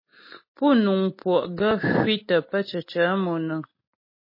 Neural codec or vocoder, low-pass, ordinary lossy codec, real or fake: none; 5.4 kHz; MP3, 24 kbps; real